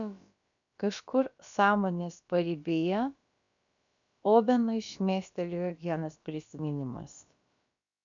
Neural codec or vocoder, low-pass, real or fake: codec, 16 kHz, about 1 kbps, DyCAST, with the encoder's durations; 7.2 kHz; fake